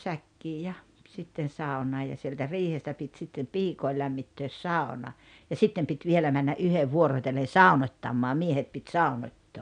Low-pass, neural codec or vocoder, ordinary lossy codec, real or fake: 9.9 kHz; none; none; real